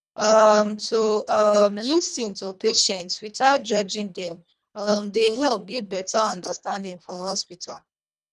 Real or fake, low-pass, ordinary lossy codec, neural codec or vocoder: fake; none; none; codec, 24 kHz, 1.5 kbps, HILCodec